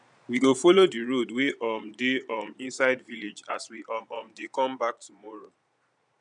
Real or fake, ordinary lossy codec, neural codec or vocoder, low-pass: fake; none; vocoder, 22.05 kHz, 80 mel bands, Vocos; 9.9 kHz